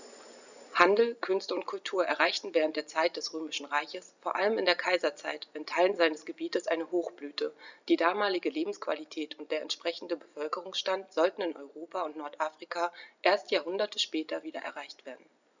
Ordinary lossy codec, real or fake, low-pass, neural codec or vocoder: none; real; 7.2 kHz; none